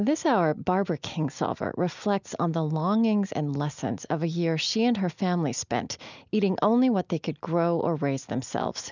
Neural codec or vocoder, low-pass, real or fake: none; 7.2 kHz; real